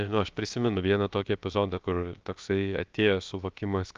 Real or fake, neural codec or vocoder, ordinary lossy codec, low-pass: fake; codec, 16 kHz, 0.7 kbps, FocalCodec; Opus, 24 kbps; 7.2 kHz